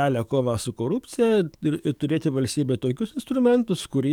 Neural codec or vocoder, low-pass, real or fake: codec, 44.1 kHz, 7.8 kbps, DAC; 19.8 kHz; fake